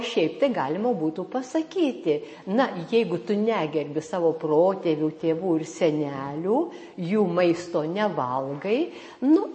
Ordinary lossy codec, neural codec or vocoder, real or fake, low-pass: MP3, 32 kbps; none; real; 9.9 kHz